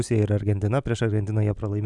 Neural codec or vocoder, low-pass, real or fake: none; 10.8 kHz; real